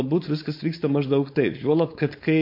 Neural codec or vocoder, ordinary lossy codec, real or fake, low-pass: codec, 16 kHz, 4.8 kbps, FACodec; MP3, 32 kbps; fake; 5.4 kHz